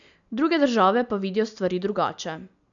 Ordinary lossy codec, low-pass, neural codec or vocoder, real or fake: none; 7.2 kHz; none; real